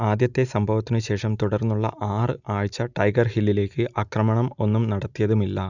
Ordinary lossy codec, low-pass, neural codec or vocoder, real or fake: none; 7.2 kHz; none; real